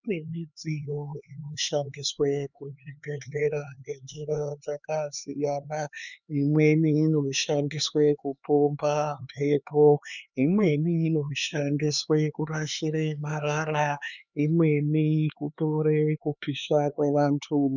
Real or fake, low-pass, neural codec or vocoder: fake; 7.2 kHz; codec, 16 kHz, 4 kbps, X-Codec, HuBERT features, trained on LibriSpeech